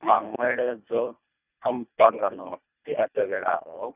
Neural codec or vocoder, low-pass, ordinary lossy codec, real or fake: codec, 24 kHz, 1.5 kbps, HILCodec; 3.6 kHz; none; fake